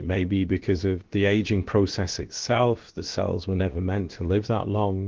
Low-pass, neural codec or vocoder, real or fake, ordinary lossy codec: 7.2 kHz; codec, 16 kHz, about 1 kbps, DyCAST, with the encoder's durations; fake; Opus, 16 kbps